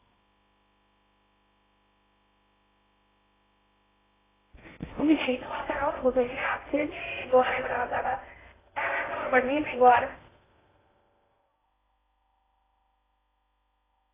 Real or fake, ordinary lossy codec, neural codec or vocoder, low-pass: fake; AAC, 16 kbps; codec, 16 kHz in and 24 kHz out, 0.6 kbps, FocalCodec, streaming, 2048 codes; 3.6 kHz